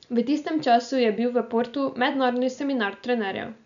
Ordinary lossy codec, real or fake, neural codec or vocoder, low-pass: none; real; none; 7.2 kHz